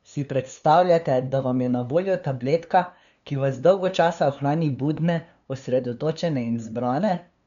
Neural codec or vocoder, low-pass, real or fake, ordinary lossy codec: codec, 16 kHz, 2 kbps, FunCodec, trained on LibriTTS, 25 frames a second; 7.2 kHz; fake; none